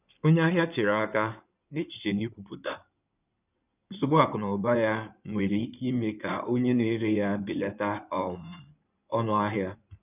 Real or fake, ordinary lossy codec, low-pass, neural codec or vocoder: fake; none; 3.6 kHz; codec, 16 kHz in and 24 kHz out, 2.2 kbps, FireRedTTS-2 codec